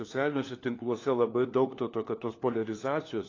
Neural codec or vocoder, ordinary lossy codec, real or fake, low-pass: codec, 16 kHz, 4 kbps, FunCodec, trained on LibriTTS, 50 frames a second; AAC, 32 kbps; fake; 7.2 kHz